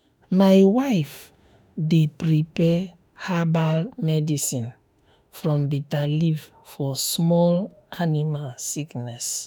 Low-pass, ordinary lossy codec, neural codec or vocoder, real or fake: none; none; autoencoder, 48 kHz, 32 numbers a frame, DAC-VAE, trained on Japanese speech; fake